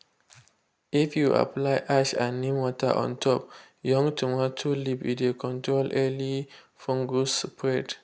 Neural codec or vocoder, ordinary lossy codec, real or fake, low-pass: none; none; real; none